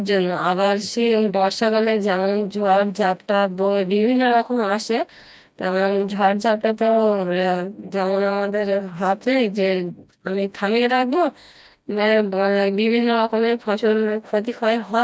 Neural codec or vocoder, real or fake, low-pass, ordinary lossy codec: codec, 16 kHz, 1 kbps, FreqCodec, smaller model; fake; none; none